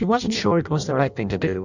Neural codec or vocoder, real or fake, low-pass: codec, 16 kHz in and 24 kHz out, 0.6 kbps, FireRedTTS-2 codec; fake; 7.2 kHz